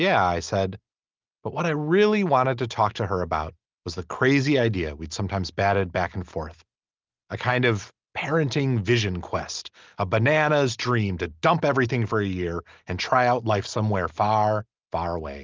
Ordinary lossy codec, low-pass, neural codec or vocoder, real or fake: Opus, 32 kbps; 7.2 kHz; none; real